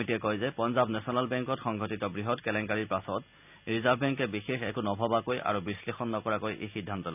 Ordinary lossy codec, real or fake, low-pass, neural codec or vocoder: none; real; 3.6 kHz; none